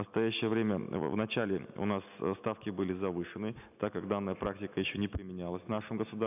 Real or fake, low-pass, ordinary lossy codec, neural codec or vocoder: real; 3.6 kHz; none; none